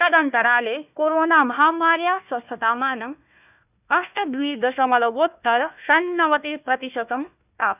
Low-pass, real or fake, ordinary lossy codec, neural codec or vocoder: 3.6 kHz; fake; none; codec, 16 kHz, 1 kbps, FunCodec, trained on Chinese and English, 50 frames a second